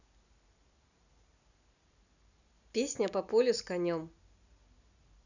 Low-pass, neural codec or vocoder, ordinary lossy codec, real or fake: 7.2 kHz; none; none; real